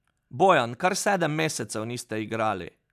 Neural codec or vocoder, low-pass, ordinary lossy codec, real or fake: none; 14.4 kHz; none; real